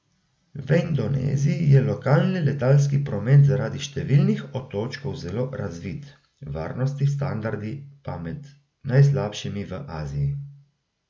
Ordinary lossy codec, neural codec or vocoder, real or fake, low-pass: none; none; real; none